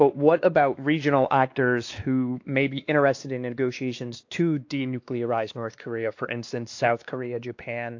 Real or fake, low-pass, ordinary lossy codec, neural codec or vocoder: fake; 7.2 kHz; AAC, 48 kbps; codec, 16 kHz, 2 kbps, X-Codec, WavLM features, trained on Multilingual LibriSpeech